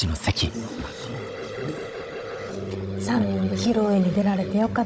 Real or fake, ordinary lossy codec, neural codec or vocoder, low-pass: fake; none; codec, 16 kHz, 16 kbps, FunCodec, trained on Chinese and English, 50 frames a second; none